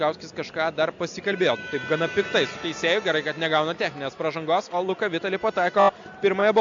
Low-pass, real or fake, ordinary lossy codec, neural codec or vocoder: 7.2 kHz; real; AAC, 64 kbps; none